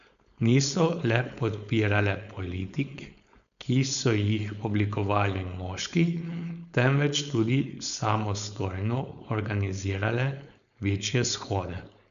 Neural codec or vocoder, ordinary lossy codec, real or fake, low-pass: codec, 16 kHz, 4.8 kbps, FACodec; none; fake; 7.2 kHz